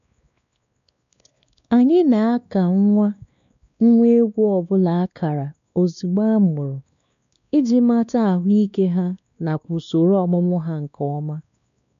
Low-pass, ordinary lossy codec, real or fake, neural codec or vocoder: 7.2 kHz; none; fake; codec, 16 kHz, 2 kbps, X-Codec, WavLM features, trained on Multilingual LibriSpeech